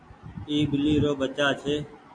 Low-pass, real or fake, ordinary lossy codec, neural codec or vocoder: 9.9 kHz; real; AAC, 64 kbps; none